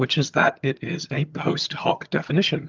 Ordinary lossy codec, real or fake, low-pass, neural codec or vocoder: Opus, 24 kbps; fake; 7.2 kHz; vocoder, 22.05 kHz, 80 mel bands, HiFi-GAN